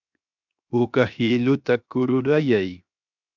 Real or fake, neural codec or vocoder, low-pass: fake; codec, 16 kHz, 0.7 kbps, FocalCodec; 7.2 kHz